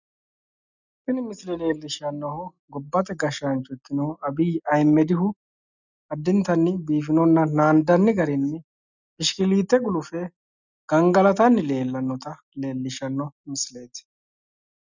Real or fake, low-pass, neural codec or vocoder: real; 7.2 kHz; none